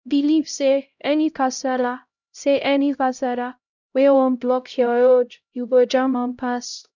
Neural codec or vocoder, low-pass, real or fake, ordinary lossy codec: codec, 16 kHz, 0.5 kbps, X-Codec, HuBERT features, trained on LibriSpeech; 7.2 kHz; fake; none